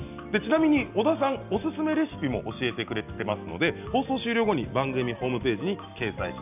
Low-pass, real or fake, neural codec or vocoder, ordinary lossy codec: 3.6 kHz; real; none; none